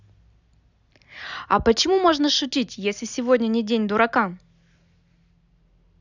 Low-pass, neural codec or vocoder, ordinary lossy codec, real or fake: 7.2 kHz; none; none; real